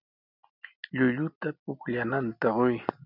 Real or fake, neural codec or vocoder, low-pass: real; none; 5.4 kHz